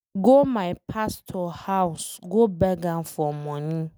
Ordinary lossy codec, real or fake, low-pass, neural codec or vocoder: none; real; none; none